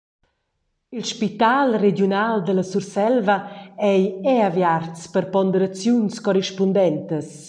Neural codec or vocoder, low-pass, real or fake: none; 9.9 kHz; real